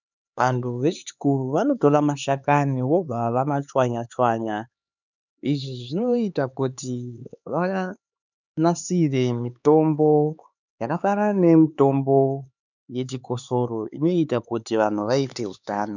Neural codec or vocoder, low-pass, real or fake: codec, 16 kHz, 4 kbps, X-Codec, HuBERT features, trained on LibriSpeech; 7.2 kHz; fake